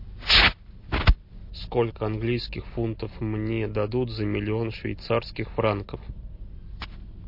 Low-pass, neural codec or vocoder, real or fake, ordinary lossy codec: 5.4 kHz; none; real; MP3, 32 kbps